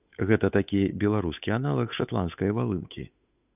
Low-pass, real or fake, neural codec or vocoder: 3.6 kHz; fake; codec, 16 kHz, 8 kbps, FunCodec, trained on Chinese and English, 25 frames a second